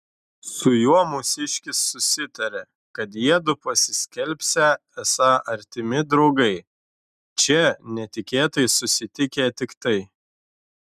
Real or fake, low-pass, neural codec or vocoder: real; 14.4 kHz; none